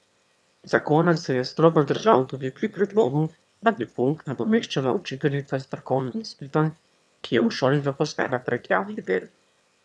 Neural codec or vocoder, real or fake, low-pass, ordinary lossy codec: autoencoder, 22.05 kHz, a latent of 192 numbers a frame, VITS, trained on one speaker; fake; none; none